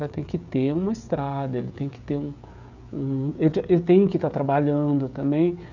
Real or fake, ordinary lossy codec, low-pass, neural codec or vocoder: fake; none; 7.2 kHz; codec, 16 kHz, 16 kbps, FreqCodec, smaller model